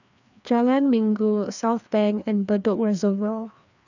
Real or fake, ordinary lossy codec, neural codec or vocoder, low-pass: fake; none; codec, 16 kHz, 2 kbps, FreqCodec, larger model; 7.2 kHz